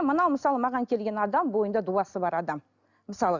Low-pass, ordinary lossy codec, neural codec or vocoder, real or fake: 7.2 kHz; none; none; real